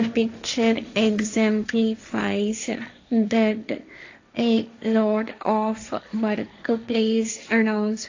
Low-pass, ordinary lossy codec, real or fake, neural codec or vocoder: 7.2 kHz; AAC, 48 kbps; fake; codec, 16 kHz, 1.1 kbps, Voila-Tokenizer